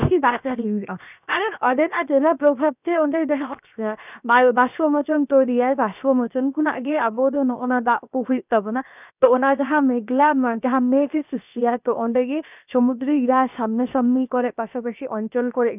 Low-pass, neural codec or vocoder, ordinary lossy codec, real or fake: 3.6 kHz; codec, 16 kHz, 0.7 kbps, FocalCodec; none; fake